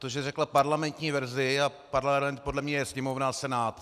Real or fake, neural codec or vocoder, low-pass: real; none; 14.4 kHz